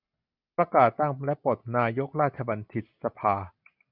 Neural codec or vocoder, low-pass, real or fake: none; 5.4 kHz; real